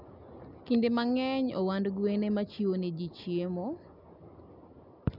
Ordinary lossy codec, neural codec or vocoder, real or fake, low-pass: none; none; real; 5.4 kHz